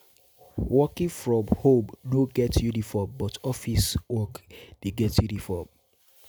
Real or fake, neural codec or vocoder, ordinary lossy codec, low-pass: real; none; none; none